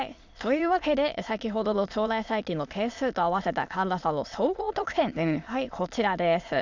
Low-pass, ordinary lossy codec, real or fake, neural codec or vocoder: 7.2 kHz; Opus, 64 kbps; fake; autoencoder, 22.05 kHz, a latent of 192 numbers a frame, VITS, trained on many speakers